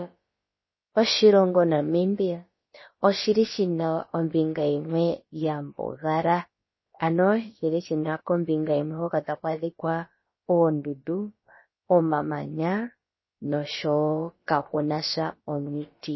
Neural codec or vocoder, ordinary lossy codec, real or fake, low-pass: codec, 16 kHz, about 1 kbps, DyCAST, with the encoder's durations; MP3, 24 kbps; fake; 7.2 kHz